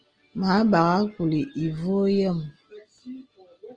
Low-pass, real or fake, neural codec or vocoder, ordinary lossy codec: 9.9 kHz; real; none; Opus, 24 kbps